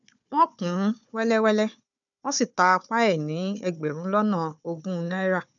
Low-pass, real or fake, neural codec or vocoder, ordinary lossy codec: 7.2 kHz; fake; codec, 16 kHz, 4 kbps, FunCodec, trained on Chinese and English, 50 frames a second; none